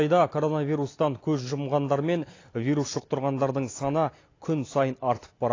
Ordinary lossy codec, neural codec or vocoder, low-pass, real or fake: AAC, 32 kbps; none; 7.2 kHz; real